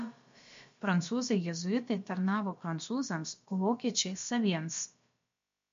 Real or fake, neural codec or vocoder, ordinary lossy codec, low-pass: fake; codec, 16 kHz, about 1 kbps, DyCAST, with the encoder's durations; MP3, 48 kbps; 7.2 kHz